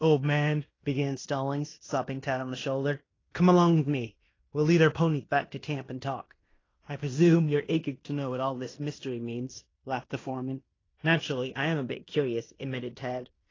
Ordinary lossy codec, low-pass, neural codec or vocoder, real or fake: AAC, 32 kbps; 7.2 kHz; codec, 16 kHz in and 24 kHz out, 0.9 kbps, LongCat-Audio-Codec, fine tuned four codebook decoder; fake